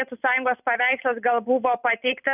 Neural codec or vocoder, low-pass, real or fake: none; 3.6 kHz; real